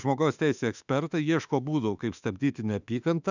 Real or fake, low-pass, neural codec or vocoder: fake; 7.2 kHz; autoencoder, 48 kHz, 32 numbers a frame, DAC-VAE, trained on Japanese speech